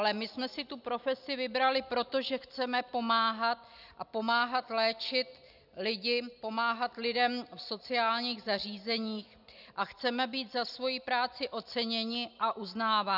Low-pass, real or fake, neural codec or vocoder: 5.4 kHz; real; none